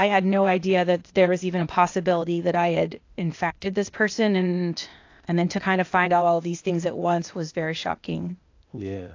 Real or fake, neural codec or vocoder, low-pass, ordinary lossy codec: fake; codec, 16 kHz, 0.8 kbps, ZipCodec; 7.2 kHz; AAC, 48 kbps